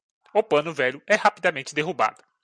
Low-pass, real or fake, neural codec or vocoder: 9.9 kHz; real; none